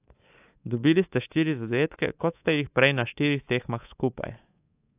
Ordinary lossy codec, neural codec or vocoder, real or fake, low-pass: AAC, 32 kbps; codec, 24 kHz, 3.1 kbps, DualCodec; fake; 3.6 kHz